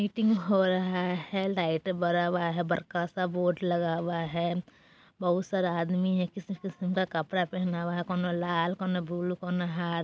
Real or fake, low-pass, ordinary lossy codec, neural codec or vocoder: real; none; none; none